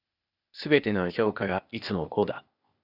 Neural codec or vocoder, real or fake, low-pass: codec, 16 kHz, 0.8 kbps, ZipCodec; fake; 5.4 kHz